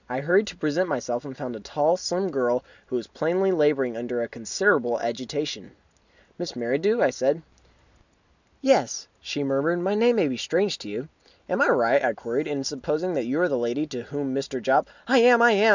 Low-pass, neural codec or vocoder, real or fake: 7.2 kHz; none; real